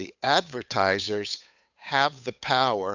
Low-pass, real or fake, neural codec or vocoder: 7.2 kHz; fake; codec, 16 kHz, 8 kbps, FunCodec, trained on Chinese and English, 25 frames a second